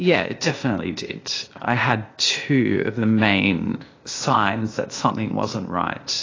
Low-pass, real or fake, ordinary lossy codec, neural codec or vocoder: 7.2 kHz; fake; AAC, 32 kbps; codec, 16 kHz, 0.8 kbps, ZipCodec